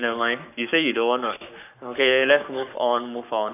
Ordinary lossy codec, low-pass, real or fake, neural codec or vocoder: none; 3.6 kHz; fake; codec, 44.1 kHz, 7.8 kbps, Pupu-Codec